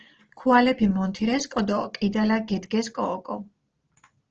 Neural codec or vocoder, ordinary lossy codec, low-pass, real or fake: none; Opus, 16 kbps; 7.2 kHz; real